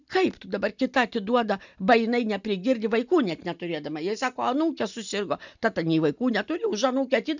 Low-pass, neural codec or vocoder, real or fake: 7.2 kHz; none; real